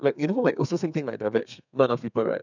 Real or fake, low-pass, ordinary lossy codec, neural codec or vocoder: fake; 7.2 kHz; none; codec, 32 kHz, 1.9 kbps, SNAC